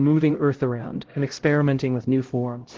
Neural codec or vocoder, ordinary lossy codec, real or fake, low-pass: codec, 16 kHz, 0.5 kbps, X-Codec, HuBERT features, trained on LibriSpeech; Opus, 16 kbps; fake; 7.2 kHz